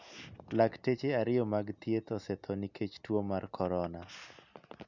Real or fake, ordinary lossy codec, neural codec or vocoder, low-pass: real; none; none; 7.2 kHz